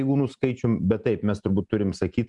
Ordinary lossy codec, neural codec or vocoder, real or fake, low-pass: MP3, 96 kbps; none; real; 10.8 kHz